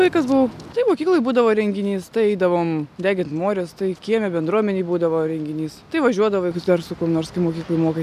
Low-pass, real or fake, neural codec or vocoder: 14.4 kHz; real; none